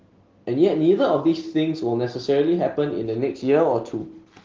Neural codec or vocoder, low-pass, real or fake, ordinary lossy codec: none; 7.2 kHz; real; Opus, 16 kbps